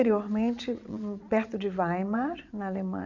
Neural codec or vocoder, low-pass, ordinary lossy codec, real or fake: vocoder, 22.05 kHz, 80 mel bands, WaveNeXt; 7.2 kHz; none; fake